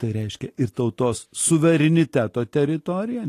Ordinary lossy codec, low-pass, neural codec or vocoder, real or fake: AAC, 48 kbps; 14.4 kHz; none; real